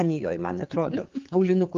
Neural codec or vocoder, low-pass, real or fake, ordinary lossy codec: codec, 16 kHz, 4 kbps, X-Codec, HuBERT features, trained on general audio; 7.2 kHz; fake; Opus, 32 kbps